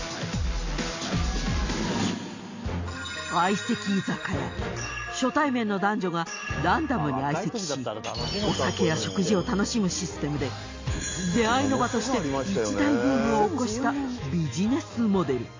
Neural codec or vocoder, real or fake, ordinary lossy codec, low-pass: none; real; AAC, 48 kbps; 7.2 kHz